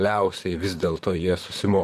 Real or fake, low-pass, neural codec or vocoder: fake; 14.4 kHz; vocoder, 44.1 kHz, 128 mel bands, Pupu-Vocoder